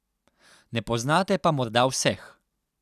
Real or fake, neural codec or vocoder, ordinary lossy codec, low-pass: real; none; none; 14.4 kHz